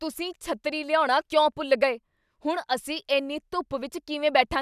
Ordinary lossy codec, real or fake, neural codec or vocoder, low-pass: none; real; none; 14.4 kHz